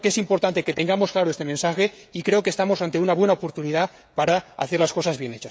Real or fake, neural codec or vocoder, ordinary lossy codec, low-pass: fake; codec, 16 kHz, 16 kbps, FreqCodec, smaller model; none; none